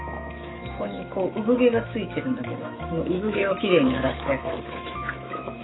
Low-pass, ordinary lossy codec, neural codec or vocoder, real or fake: 7.2 kHz; AAC, 16 kbps; autoencoder, 48 kHz, 128 numbers a frame, DAC-VAE, trained on Japanese speech; fake